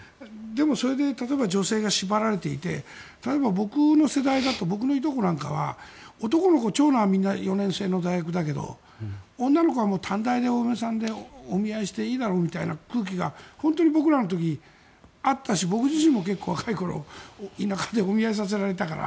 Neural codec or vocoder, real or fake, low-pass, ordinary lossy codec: none; real; none; none